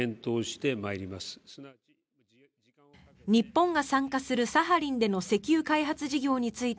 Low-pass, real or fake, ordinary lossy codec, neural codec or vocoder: none; real; none; none